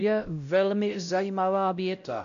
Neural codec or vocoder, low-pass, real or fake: codec, 16 kHz, 0.5 kbps, X-Codec, WavLM features, trained on Multilingual LibriSpeech; 7.2 kHz; fake